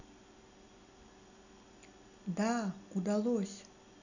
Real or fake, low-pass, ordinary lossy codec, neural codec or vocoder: real; 7.2 kHz; none; none